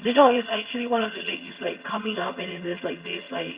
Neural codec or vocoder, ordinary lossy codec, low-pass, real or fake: vocoder, 22.05 kHz, 80 mel bands, HiFi-GAN; Opus, 64 kbps; 3.6 kHz; fake